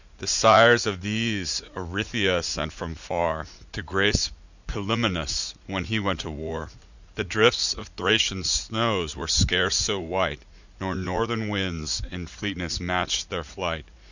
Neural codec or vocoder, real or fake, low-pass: vocoder, 44.1 kHz, 80 mel bands, Vocos; fake; 7.2 kHz